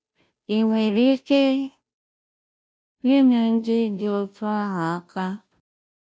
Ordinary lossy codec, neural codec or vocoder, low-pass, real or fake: none; codec, 16 kHz, 0.5 kbps, FunCodec, trained on Chinese and English, 25 frames a second; none; fake